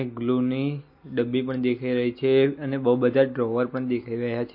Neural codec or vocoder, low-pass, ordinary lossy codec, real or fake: none; 5.4 kHz; MP3, 32 kbps; real